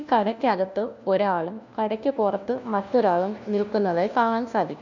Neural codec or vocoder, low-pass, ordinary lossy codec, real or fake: codec, 16 kHz, 1 kbps, FunCodec, trained on LibriTTS, 50 frames a second; 7.2 kHz; none; fake